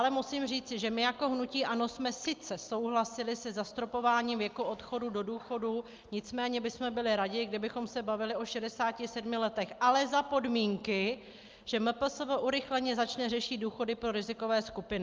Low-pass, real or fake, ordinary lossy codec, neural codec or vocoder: 7.2 kHz; real; Opus, 24 kbps; none